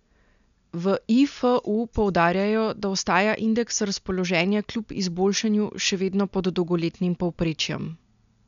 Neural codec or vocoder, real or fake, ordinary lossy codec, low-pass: none; real; MP3, 96 kbps; 7.2 kHz